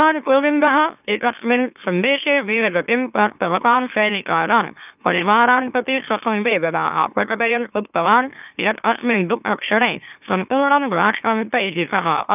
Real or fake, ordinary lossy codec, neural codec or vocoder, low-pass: fake; none; autoencoder, 44.1 kHz, a latent of 192 numbers a frame, MeloTTS; 3.6 kHz